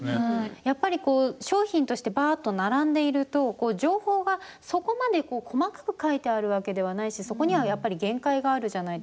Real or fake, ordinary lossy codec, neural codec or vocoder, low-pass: real; none; none; none